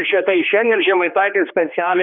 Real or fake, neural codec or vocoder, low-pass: fake; codec, 16 kHz, 2 kbps, X-Codec, HuBERT features, trained on general audio; 5.4 kHz